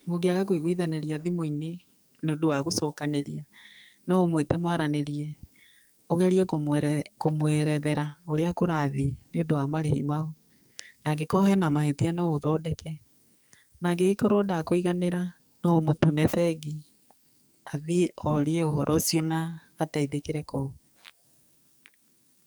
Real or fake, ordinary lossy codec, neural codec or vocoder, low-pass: fake; none; codec, 44.1 kHz, 2.6 kbps, SNAC; none